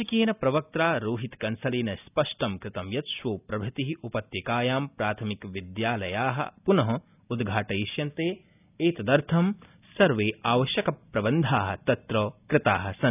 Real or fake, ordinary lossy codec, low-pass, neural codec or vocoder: real; none; 3.6 kHz; none